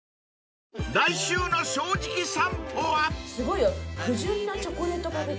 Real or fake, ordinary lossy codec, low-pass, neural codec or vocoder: real; none; none; none